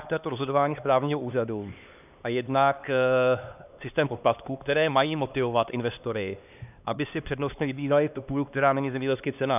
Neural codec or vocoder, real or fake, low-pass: codec, 16 kHz, 2 kbps, X-Codec, HuBERT features, trained on LibriSpeech; fake; 3.6 kHz